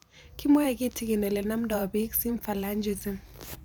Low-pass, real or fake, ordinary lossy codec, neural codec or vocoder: none; fake; none; vocoder, 44.1 kHz, 128 mel bands every 512 samples, BigVGAN v2